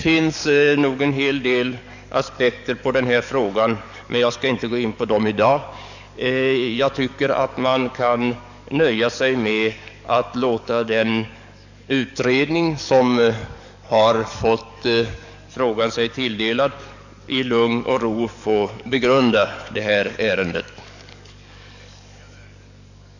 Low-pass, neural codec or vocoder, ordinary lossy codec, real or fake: 7.2 kHz; codec, 44.1 kHz, 7.8 kbps, DAC; none; fake